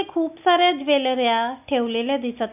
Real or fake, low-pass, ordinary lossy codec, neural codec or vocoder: real; 3.6 kHz; none; none